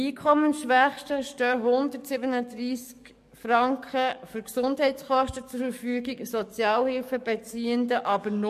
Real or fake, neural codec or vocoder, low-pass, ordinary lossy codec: fake; codec, 44.1 kHz, 7.8 kbps, DAC; 14.4 kHz; MP3, 64 kbps